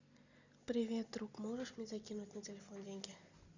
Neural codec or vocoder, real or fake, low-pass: none; real; 7.2 kHz